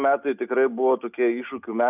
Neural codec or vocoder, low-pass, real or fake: none; 3.6 kHz; real